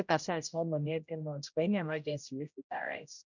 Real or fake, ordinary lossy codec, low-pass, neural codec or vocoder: fake; none; 7.2 kHz; codec, 16 kHz, 0.5 kbps, X-Codec, HuBERT features, trained on general audio